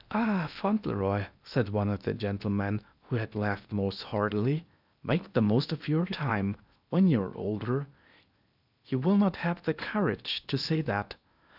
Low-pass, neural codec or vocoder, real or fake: 5.4 kHz; codec, 16 kHz in and 24 kHz out, 0.8 kbps, FocalCodec, streaming, 65536 codes; fake